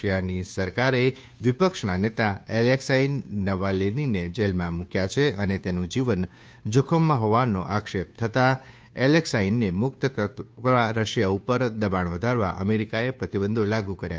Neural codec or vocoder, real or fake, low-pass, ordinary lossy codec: codec, 16 kHz, 2 kbps, FunCodec, trained on Chinese and English, 25 frames a second; fake; none; none